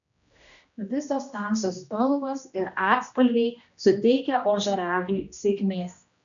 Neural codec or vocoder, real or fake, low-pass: codec, 16 kHz, 1 kbps, X-Codec, HuBERT features, trained on general audio; fake; 7.2 kHz